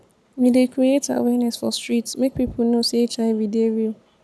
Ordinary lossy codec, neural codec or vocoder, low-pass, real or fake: none; none; none; real